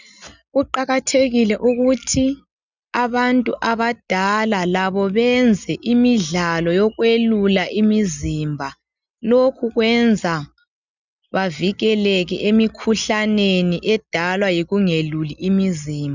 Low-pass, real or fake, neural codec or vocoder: 7.2 kHz; real; none